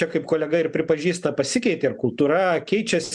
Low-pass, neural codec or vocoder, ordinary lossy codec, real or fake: 10.8 kHz; none; MP3, 96 kbps; real